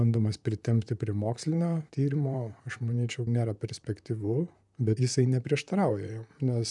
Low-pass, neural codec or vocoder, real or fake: 10.8 kHz; vocoder, 44.1 kHz, 128 mel bands, Pupu-Vocoder; fake